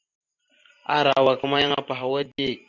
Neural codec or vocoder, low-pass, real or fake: vocoder, 44.1 kHz, 128 mel bands every 512 samples, BigVGAN v2; 7.2 kHz; fake